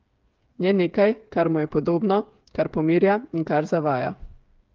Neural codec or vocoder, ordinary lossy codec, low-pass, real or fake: codec, 16 kHz, 8 kbps, FreqCodec, smaller model; Opus, 24 kbps; 7.2 kHz; fake